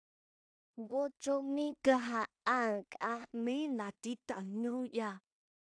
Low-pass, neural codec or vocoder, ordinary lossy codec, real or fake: 9.9 kHz; codec, 16 kHz in and 24 kHz out, 0.4 kbps, LongCat-Audio-Codec, two codebook decoder; AAC, 64 kbps; fake